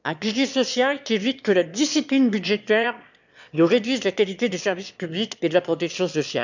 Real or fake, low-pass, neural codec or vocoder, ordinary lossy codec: fake; 7.2 kHz; autoencoder, 22.05 kHz, a latent of 192 numbers a frame, VITS, trained on one speaker; none